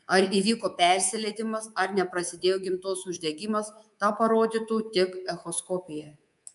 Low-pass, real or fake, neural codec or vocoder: 10.8 kHz; fake; codec, 24 kHz, 3.1 kbps, DualCodec